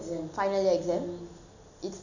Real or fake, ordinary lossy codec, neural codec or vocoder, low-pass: real; none; none; 7.2 kHz